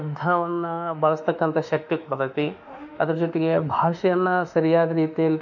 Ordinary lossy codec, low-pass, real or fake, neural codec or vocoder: MP3, 64 kbps; 7.2 kHz; fake; autoencoder, 48 kHz, 32 numbers a frame, DAC-VAE, trained on Japanese speech